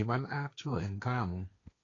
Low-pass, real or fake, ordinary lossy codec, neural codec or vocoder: 7.2 kHz; fake; AAC, 32 kbps; codec, 16 kHz, 1.1 kbps, Voila-Tokenizer